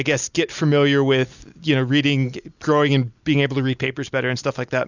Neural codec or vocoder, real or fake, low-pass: none; real; 7.2 kHz